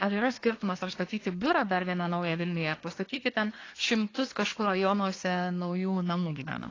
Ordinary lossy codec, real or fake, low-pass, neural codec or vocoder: AAC, 32 kbps; fake; 7.2 kHz; codec, 24 kHz, 1 kbps, SNAC